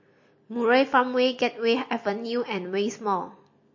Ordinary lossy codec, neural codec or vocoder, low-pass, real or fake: MP3, 32 kbps; none; 7.2 kHz; real